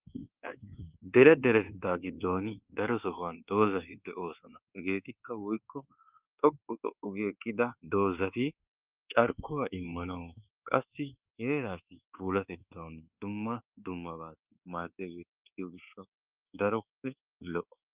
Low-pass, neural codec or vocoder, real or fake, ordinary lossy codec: 3.6 kHz; codec, 24 kHz, 1.2 kbps, DualCodec; fake; Opus, 24 kbps